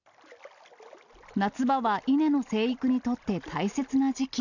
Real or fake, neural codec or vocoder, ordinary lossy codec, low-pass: real; none; none; 7.2 kHz